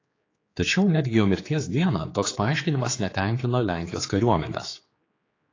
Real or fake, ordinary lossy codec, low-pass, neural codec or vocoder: fake; AAC, 32 kbps; 7.2 kHz; codec, 16 kHz, 4 kbps, X-Codec, HuBERT features, trained on general audio